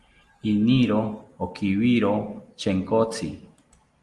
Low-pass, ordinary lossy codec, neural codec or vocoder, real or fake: 10.8 kHz; Opus, 24 kbps; none; real